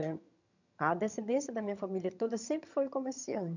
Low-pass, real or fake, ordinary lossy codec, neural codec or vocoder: 7.2 kHz; fake; none; vocoder, 22.05 kHz, 80 mel bands, HiFi-GAN